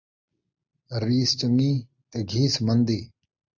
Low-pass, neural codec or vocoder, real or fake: 7.2 kHz; none; real